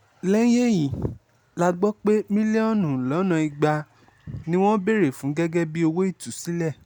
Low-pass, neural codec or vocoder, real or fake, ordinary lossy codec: 19.8 kHz; none; real; none